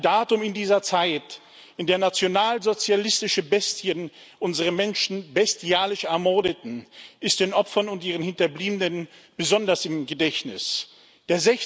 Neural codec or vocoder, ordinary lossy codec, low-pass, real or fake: none; none; none; real